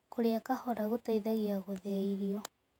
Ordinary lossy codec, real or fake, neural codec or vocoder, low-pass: none; fake; vocoder, 48 kHz, 128 mel bands, Vocos; 19.8 kHz